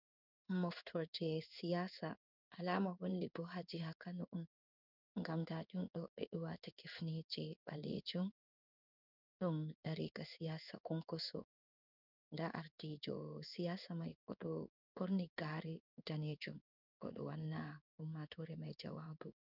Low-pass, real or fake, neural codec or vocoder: 5.4 kHz; fake; codec, 16 kHz in and 24 kHz out, 1 kbps, XY-Tokenizer